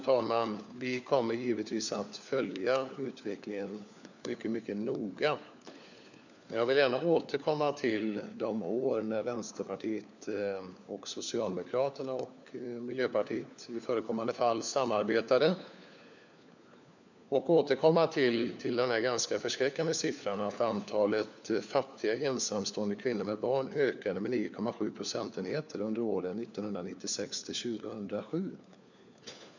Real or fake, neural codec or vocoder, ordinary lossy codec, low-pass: fake; codec, 16 kHz, 4 kbps, FunCodec, trained on LibriTTS, 50 frames a second; none; 7.2 kHz